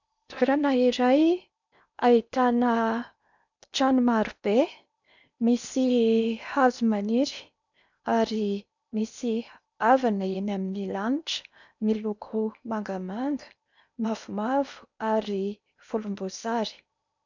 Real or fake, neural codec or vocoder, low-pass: fake; codec, 16 kHz in and 24 kHz out, 0.8 kbps, FocalCodec, streaming, 65536 codes; 7.2 kHz